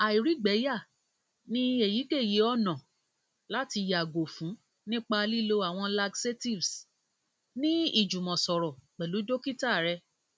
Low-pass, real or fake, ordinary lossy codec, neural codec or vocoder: none; real; none; none